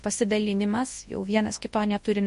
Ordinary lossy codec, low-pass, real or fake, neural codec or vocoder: MP3, 48 kbps; 10.8 kHz; fake; codec, 24 kHz, 0.9 kbps, WavTokenizer, large speech release